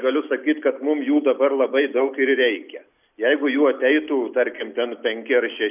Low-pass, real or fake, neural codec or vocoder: 3.6 kHz; real; none